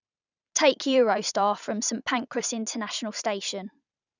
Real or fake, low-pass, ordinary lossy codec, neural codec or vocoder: fake; 7.2 kHz; none; vocoder, 44.1 kHz, 128 mel bands every 512 samples, BigVGAN v2